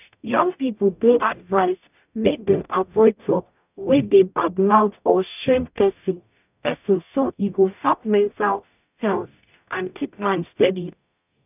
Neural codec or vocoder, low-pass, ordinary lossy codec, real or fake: codec, 44.1 kHz, 0.9 kbps, DAC; 3.6 kHz; none; fake